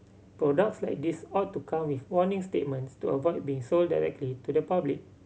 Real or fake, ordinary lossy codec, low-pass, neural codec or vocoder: real; none; none; none